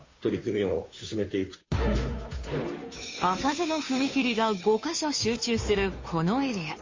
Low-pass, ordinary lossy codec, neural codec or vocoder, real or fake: 7.2 kHz; MP3, 32 kbps; codec, 16 kHz, 2 kbps, FunCodec, trained on Chinese and English, 25 frames a second; fake